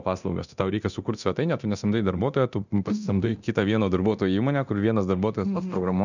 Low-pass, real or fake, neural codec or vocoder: 7.2 kHz; fake; codec, 24 kHz, 0.9 kbps, DualCodec